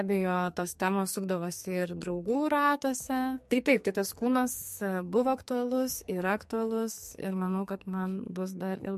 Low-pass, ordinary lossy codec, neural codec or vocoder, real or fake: 14.4 kHz; MP3, 64 kbps; codec, 44.1 kHz, 2.6 kbps, SNAC; fake